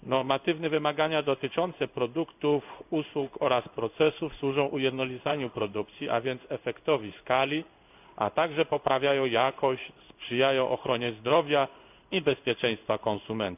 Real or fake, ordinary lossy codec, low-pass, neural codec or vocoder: fake; none; 3.6 kHz; codec, 16 kHz in and 24 kHz out, 1 kbps, XY-Tokenizer